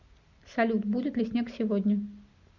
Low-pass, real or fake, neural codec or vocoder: 7.2 kHz; real; none